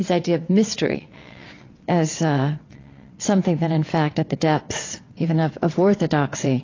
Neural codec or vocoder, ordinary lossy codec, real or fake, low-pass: vocoder, 22.05 kHz, 80 mel bands, WaveNeXt; AAC, 32 kbps; fake; 7.2 kHz